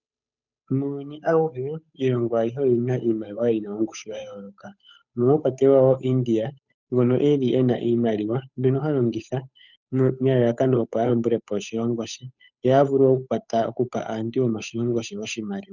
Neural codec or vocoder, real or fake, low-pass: codec, 16 kHz, 8 kbps, FunCodec, trained on Chinese and English, 25 frames a second; fake; 7.2 kHz